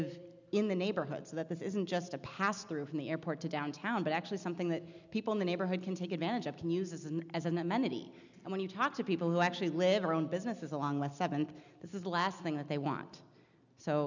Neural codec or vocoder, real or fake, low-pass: none; real; 7.2 kHz